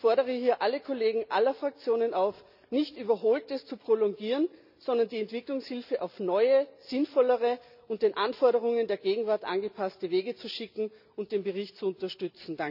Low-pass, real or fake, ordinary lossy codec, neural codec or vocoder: 5.4 kHz; real; none; none